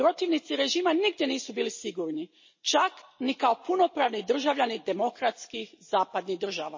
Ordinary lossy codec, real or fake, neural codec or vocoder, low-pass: MP3, 32 kbps; real; none; 7.2 kHz